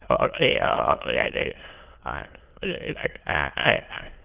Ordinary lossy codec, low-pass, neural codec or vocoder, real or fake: Opus, 16 kbps; 3.6 kHz; autoencoder, 22.05 kHz, a latent of 192 numbers a frame, VITS, trained on many speakers; fake